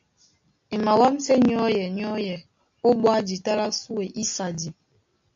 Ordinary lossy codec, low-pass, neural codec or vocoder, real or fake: AAC, 64 kbps; 7.2 kHz; none; real